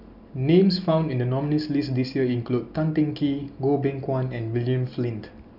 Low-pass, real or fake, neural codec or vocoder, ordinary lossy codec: 5.4 kHz; real; none; none